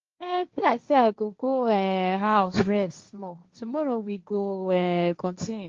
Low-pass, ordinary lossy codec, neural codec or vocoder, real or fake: 7.2 kHz; Opus, 32 kbps; codec, 16 kHz, 1.1 kbps, Voila-Tokenizer; fake